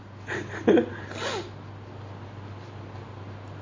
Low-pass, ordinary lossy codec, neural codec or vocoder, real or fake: 7.2 kHz; MP3, 32 kbps; none; real